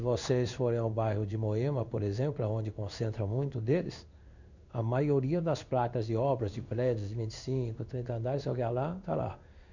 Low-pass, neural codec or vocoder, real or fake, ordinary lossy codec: 7.2 kHz; codec, 16 kHz in and 24 kHz out, 1 kbps, XY-Tokenizer; fake; none